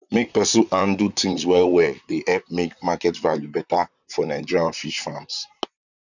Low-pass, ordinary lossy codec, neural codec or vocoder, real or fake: 7.2 kHz; none; vocoder, 44.1 kHz, 128 mel bands, Pupu-Vocoder; fake